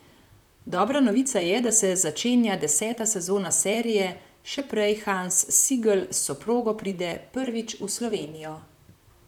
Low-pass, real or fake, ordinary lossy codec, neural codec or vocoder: 19.8 kHz; fake; none; vocoder, 44.1 kHz, 128 mel bands, Pupu-Vocoder